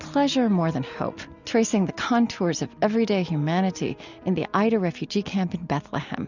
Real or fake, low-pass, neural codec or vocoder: real; 7.2 kHz; none